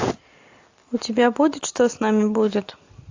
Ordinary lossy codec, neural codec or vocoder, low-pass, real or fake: AAC, 48 kbps; none; 7.2 kHz; real